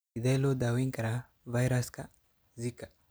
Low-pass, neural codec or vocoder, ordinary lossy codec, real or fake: none; none; none; real